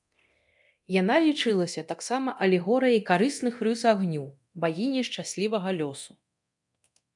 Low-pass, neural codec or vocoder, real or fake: 10.8 kHz; codec, 24 kHz, 0.9 kbps, DualCodec; fake